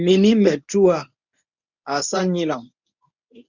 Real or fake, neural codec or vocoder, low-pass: fake; codec, 24 kHz, 0.9 kbps, WavTokenizer, medium speech release version 1; 7.2 kHz